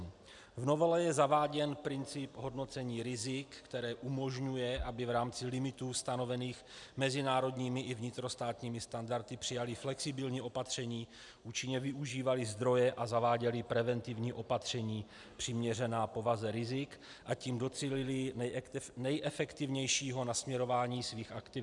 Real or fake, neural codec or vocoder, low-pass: real; none; 10.8 kHz